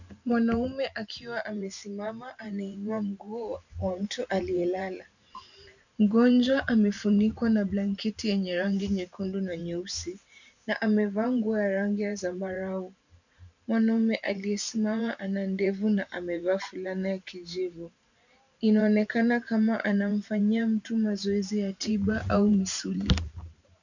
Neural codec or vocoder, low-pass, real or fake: vocoder, 44.1 kHz, 128 mel bands every 512 samples, BigVGAN v2; 7.2 kHz; fake